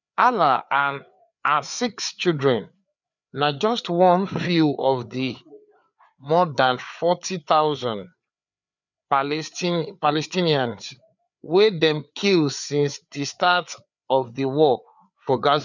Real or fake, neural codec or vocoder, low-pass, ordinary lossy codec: fake; codec, 16 kHz, 4 kbps, FreqCodec, larger model; 7.2 kHz; none